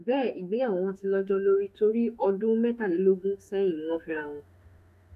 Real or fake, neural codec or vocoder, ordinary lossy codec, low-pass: fake; autoencoder, 48 kHz, 32 numbers a frame, DAC-VAE, trained on Japanese speech; none; 14.4 kHz